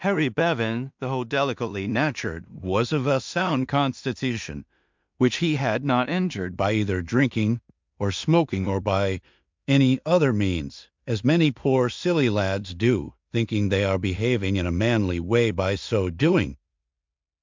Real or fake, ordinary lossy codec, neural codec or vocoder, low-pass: fake; MP3, 64 kbps; codec, 16 kHz in and 24 kHz out, 0.4 kbps, LongCat-Audio-Codec, two codebook decoder; 7.2 kHz